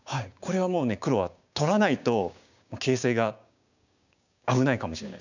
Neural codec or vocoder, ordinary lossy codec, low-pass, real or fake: codec, 16 kHz, 6 kbps, DAC; none; 7.2 kHz; fake